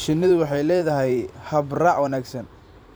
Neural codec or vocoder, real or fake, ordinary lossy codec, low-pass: vocoder, 44.1 kHz, 128 mel bands every 512 samples, BigVGAN v2; fake; none; none